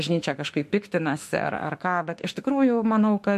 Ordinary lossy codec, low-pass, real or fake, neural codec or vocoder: MP3, 64 kbps; 14.4 kHz; fake; autoencoder, 48 kHz, 32 numbers a frame, DAC-VAE, trained on Japanese speech